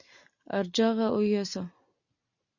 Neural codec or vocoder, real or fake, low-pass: none; real; 7.2 kHz